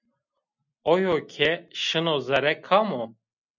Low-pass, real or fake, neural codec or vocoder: 7.2 kHz; real; none